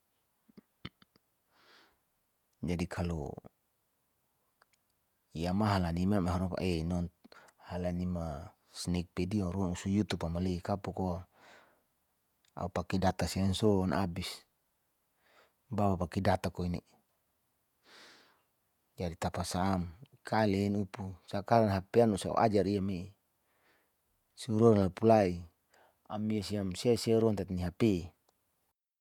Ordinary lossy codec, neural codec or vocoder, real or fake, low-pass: none; vocoder, 48 kHz, 128 mel bands, Vocos; fake; 19.8 kHz